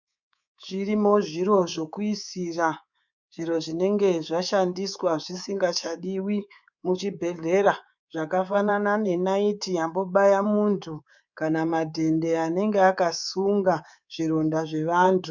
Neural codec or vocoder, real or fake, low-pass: codec, 24 kHz, 3.1 kbps, DualCodec; fake; 7.2 kHz